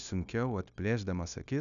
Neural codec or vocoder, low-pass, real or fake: codec, 16 kHz, 0.9 kbps, LongCat-Audio-Codec; 7.2 kHz; fake